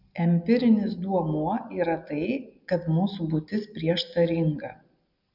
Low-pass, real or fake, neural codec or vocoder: 5.4 kHz; real; none